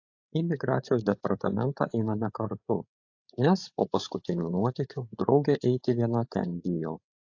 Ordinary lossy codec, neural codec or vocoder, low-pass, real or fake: AAC, 48 kbps; codec, 16 kHz, 8 kbps, FreqCodec, larger model; 7.2 kHz; fake